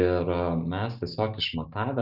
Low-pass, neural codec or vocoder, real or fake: 5.4 kHz; none; real